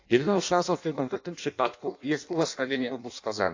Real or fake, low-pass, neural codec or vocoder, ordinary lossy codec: fake; 7.2 kHz; codec, 16 kHz in and 24 kHz out, 0.6 kbps, FireRedTTS-2 codec; AAC, 48 kbps